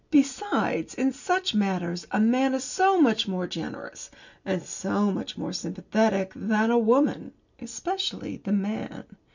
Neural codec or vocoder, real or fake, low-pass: none; real; 7.2 kHz